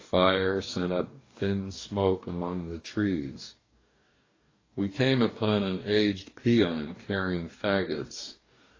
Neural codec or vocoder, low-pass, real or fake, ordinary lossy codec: codec, 44.1 kHz, 2.6 kbps, DAC; 7.2 kHz; fake; AAC, 32 kbps